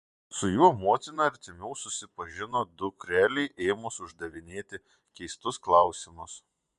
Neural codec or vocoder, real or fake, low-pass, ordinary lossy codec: vocoder, 24 kHz, 100 mel bands, Vocos; fake; 10.8 kHz; MP3, 96 kbps